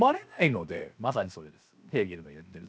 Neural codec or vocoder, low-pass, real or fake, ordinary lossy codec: codec, 16 kHz, 0.7 kbps, FocalCodec; none; fake; none